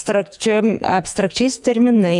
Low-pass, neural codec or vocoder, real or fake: 10.8 kHz; codec, 44.1 kHz, 2.6 kbps, SNAC; fake